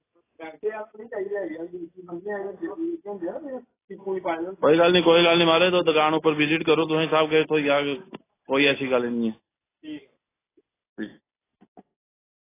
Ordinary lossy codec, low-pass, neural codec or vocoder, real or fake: AAC, 16 kbps; 3.6 kHz; none; real